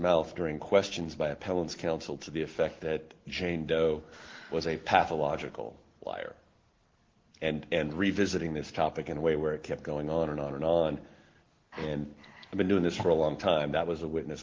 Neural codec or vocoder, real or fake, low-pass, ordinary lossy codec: none; real; 7.2 kHz; Opus, 16 kbps